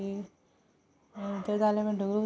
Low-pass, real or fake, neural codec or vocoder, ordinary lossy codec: none; real; none; none